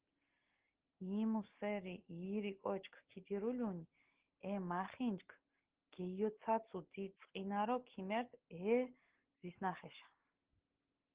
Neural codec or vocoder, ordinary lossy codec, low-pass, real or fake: none; Opus, 16 kbps; 3.6 kHz; real